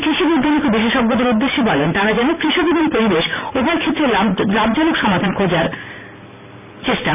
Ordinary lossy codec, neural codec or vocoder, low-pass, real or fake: none; vocoder, 44.1 kHz, 128 mel bands every 512 samples, BigVGAN v2; 3.6 kHz; fake